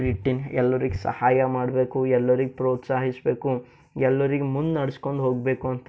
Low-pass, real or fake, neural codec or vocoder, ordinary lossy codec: none; real; none; none